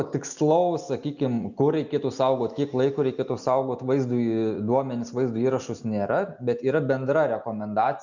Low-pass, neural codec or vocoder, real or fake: 7.2 kHz; none; real